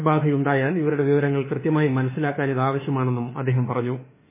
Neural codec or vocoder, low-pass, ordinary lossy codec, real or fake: autoencoder, 48 kHz, 32 numbers a frame, DAC-VAE, trained on Japanese speech; 3.6 kHz; MP3, 16 kbps; fake